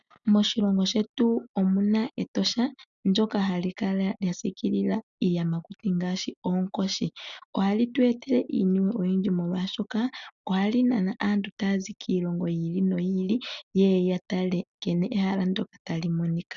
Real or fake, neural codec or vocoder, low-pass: real; none; 7.2 kHz